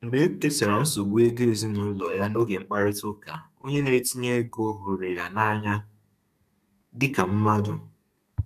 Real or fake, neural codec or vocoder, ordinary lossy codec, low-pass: fake; codec, 32 kHz, 1.9 kbps, SNAC; none; 14.4 kHz